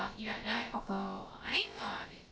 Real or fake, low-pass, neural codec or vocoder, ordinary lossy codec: fake; none; codec, 16 kHz, about 1 kbps, DyCAST, with the encoder's durations; none